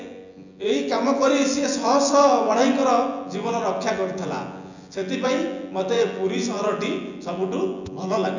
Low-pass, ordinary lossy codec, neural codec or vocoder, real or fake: 7.2 kHz; none; vocoder, 24 kHz, 100 mel bands, Vocos; fake